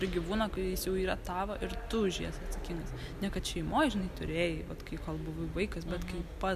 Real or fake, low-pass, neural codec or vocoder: real; 14.4 kHz; none